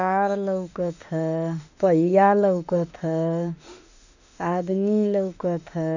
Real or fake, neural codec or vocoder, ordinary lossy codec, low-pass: fake; autoencoder, 48 kHz, 32 numbers a frame, DAC-VAE, trained on Japanese speech; none; 7.2 kHz